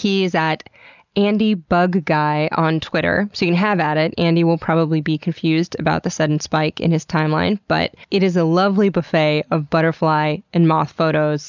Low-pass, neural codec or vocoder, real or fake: 7.2 kHz; none; real